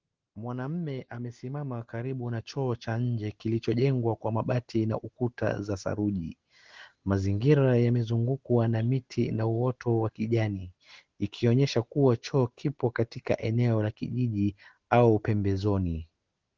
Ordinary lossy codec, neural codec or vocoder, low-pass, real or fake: Opus, 16 kbps; none; 7.2 kHz; real